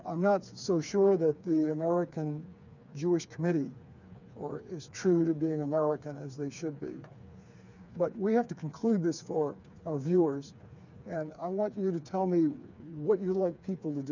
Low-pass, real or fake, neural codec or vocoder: 7.2 kHz; fake; codec, 16 kHz, 4 kbps, FreqCodec, smaller model